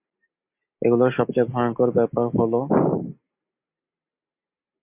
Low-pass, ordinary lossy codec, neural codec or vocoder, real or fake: 3.6 kHz; MP3, 32 kbps; none; real